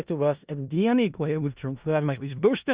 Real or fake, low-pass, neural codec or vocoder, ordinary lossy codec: fake; 3.6 kHz; codec, 16 kHz in and 24 kHz out, 0.4 kbps, LongCat-Audio-Codec, four codebook decoder; Opus, 64 kbps